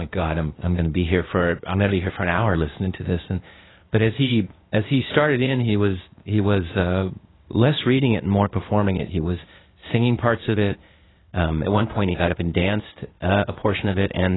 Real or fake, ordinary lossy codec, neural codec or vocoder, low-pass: fake; AAC, 16 kbps; codec, 16 kHz, 0.8 kbps, ZipCodec; 7.2 kHz